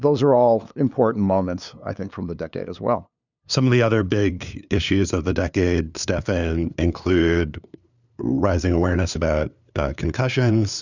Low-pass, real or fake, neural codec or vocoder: 7.2 kHz; fake; codec, 16 kHz, 2 kbps, FunCodec, trained on LibriTTS, 25 frames a second